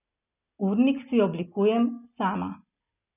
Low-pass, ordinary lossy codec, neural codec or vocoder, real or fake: 3.6 kHz; none; none; real